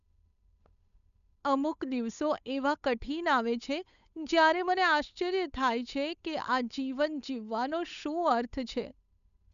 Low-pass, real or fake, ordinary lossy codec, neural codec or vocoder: 7.2 kHz; fake; none; codec, 16 kHz, 8 kbps, FunCodec, trained on Chinese and English, 25 frames a second